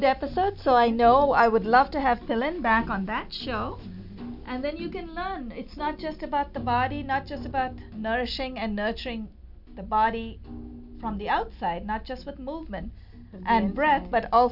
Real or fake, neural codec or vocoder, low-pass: real; none; 5.4 kHz